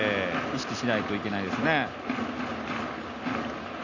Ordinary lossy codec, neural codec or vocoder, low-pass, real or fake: none; none; 7.2 kHz; real